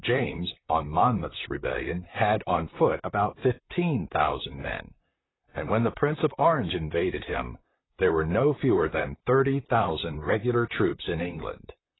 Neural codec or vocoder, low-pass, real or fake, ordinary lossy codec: vocoder, 44.1 kHz, 128 mel bands, Pupu-Vocoder; 7.2 kHz; fake; AAC, 16 kbps